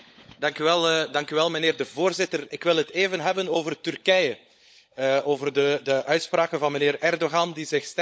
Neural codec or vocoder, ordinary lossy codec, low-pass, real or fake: codec, 16 kHz, 16 kbps, FunCodec, trained on LibriTTS, 50 frames a second; none; none; fake